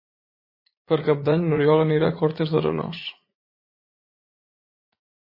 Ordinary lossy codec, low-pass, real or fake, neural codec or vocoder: MP3, 24 kbps; 5.4 kHz; fake; vocoder, 44.1 kHz, 80 mel bands, Vocos